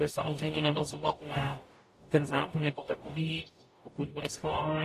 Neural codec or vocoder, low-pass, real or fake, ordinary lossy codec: codec, 44.1 kHz, 0.9 kbps, DAC; 14.4 kHz; fake; AAC, 48 kbps